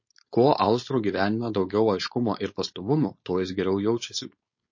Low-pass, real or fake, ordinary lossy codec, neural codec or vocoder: 7.2 kHz; fake; MP3, 32 kbps; codec, 16 kHz, 4.8 kbps, FACodec